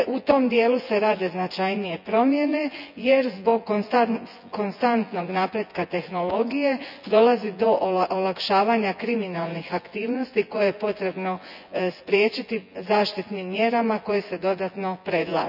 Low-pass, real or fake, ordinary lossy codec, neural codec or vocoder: 5.4 kHz; fake; none; vocoder, 24 kHz, 100 mel bands, Vocos